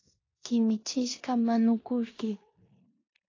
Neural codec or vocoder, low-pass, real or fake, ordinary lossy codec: codec, 16 kHz in and 24 kHz out, 0.9 kbps, LongCat-Audio-Codec, four codebook decoder; 7.2 kHz; fake; AAC, 32 kbps